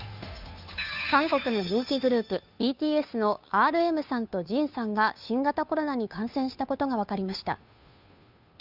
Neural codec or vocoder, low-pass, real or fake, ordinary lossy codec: codec, 16 kHz, 2 kbps, FunCodec, trained on Chinese and English, 25 frames a second; 5.4 kHz; fake; none